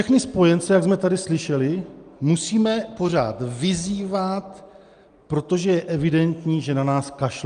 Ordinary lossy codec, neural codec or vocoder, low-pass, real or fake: Opus, 32 kbps; none; 9.9 kHz; real